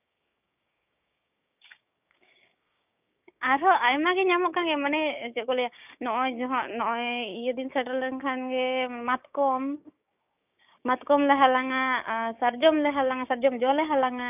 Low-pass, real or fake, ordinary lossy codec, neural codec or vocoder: 3.6 kHz; fake; none; codec, 16 kHz, 6 kbps, DAC